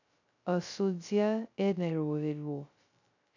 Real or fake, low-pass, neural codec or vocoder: fake; 7.2 kHz; codec, 16 kHz, 0.2 kbps, FocalCodec